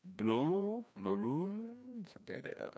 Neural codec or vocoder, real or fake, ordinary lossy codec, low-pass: codec, 16 kHz, 1 kbps, FreqCodec, larger model; fake; none; none